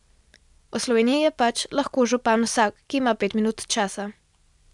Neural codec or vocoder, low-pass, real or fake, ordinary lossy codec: none; 10.8 kHz; real; MP3, 96 kbps